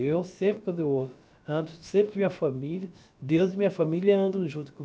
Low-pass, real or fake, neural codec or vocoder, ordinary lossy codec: none; fake; codec, 16 kHz, about 1 kbps, DyCAST, with the encoder's durations; none